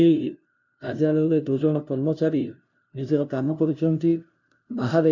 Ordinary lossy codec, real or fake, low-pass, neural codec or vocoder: none; fake; 7.2 kHz; codec, 16 kHz, 0.5 kbps, FunCodec, trained on LibriTTS, 25 frames a second